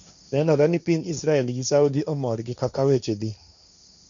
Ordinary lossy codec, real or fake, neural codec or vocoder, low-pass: none; fake; codec, 16 kHz, 1.1 kbps, Voila-Tokenizer; 7.2 kHz